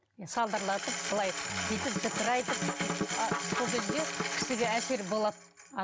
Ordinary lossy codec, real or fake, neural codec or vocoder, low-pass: none; real; none; none